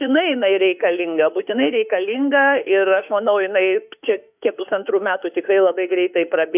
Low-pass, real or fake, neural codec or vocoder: 3.6 kHz; fake; autoencoder, 48 kHz, 32 numbers a frame, DAC-VAE, trained on Japanese speech